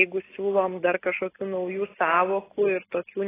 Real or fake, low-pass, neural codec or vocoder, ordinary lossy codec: real; 3.6 kHz; none; AAC, 16 kbps